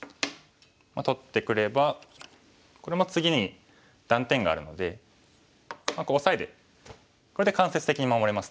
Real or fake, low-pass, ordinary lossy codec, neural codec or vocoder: real; none; none; none